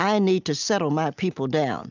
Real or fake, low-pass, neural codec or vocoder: real; 7.2 kHz; none